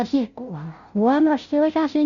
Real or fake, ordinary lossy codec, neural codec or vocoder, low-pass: fake; none; codec, 16 kHz, 0.5 kbps, FunCodec, trained on Chinese and English, 25 frames a second; 7.2 kHz